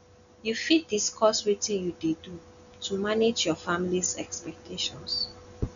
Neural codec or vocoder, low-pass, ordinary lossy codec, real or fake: none; 7.2 kHz; none; real